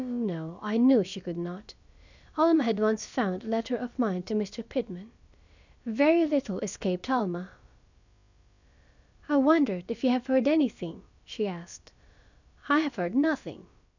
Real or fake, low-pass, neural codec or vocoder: fake; 7.2 kHz; codec, 16 kHz, about 1 kbps, DyCAST, with the encoder's durations